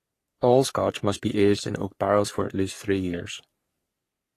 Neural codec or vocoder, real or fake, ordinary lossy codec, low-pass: codec, 44.1 kHz, 3.4 kbps, Pupu-Codec; fake; AAC, 48 kbps; 14.4 kHz